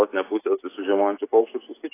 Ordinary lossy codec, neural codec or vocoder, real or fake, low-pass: AAC, 16 kbps; none; real; 3.6 kHz